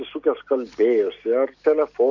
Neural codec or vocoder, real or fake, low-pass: none; real; 7.2 kHz